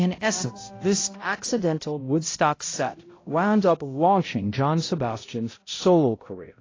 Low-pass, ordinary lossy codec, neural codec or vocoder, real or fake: 7.2 kHz; AAC, 32 kbps; codec, 16 kHz, 0.5 kbps, X-Codec, HuBERT features, trained on balanced general audio; fake